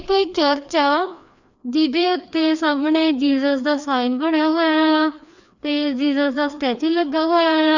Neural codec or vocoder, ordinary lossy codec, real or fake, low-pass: codec, 16 kHz, 2 kbps, FreqCodec, larger model; none; fake; 7.2 kHz